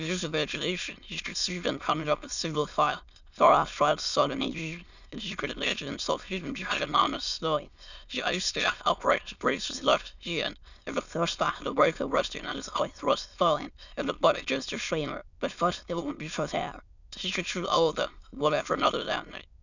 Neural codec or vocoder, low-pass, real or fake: autoencoder, 22.05 kHz, a latent of 192 numbers a frame, VITS, trained on many speakers; 7.2 kHz; fake